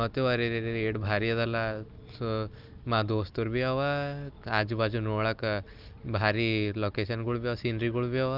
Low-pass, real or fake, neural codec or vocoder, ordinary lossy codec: 5.4 kHz; real; none; Opus, 24 kbps